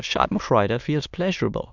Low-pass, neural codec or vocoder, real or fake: 7.2 kHz; autoencoder, 22.05 kHz, a latent of 192 numbers a frame, VITS, trained on many speakers; fake